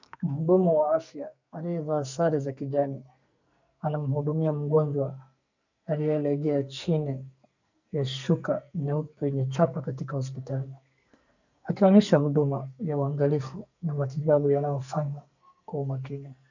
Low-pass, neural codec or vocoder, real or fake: 7.2 kHz; codec, 44.1 kHz, 2.6 kbps, SNAC; fake